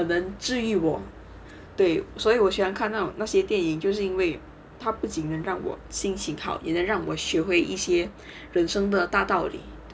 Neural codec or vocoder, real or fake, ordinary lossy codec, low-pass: none; real; none; none